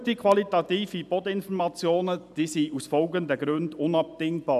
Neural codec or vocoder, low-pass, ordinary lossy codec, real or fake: none; 14.4 kHz; none; real